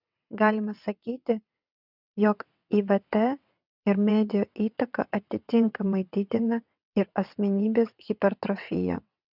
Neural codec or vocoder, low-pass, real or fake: vocoder, 22.05 kHz, 80 mel bands, WaveNeXt; 5.4 kHz; fake